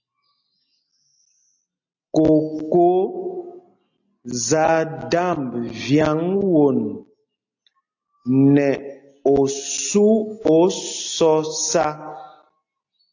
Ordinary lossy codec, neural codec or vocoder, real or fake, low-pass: AAC, 48 kbps; none; real; 7.2 kHz